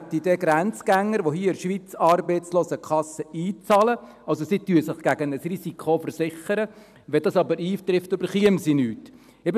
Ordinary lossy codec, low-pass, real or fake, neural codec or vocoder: none; 14.4 kHz; real; none